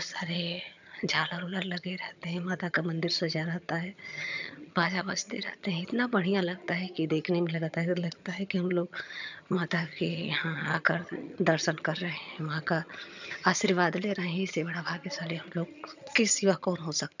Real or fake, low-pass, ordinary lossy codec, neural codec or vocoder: fake; 7.2 kHz; none; vocoder, 22.05 kHz, 80 mel bands, HiFi-GAN